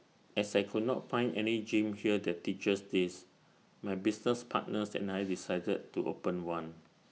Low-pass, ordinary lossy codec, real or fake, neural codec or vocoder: none; none; real; none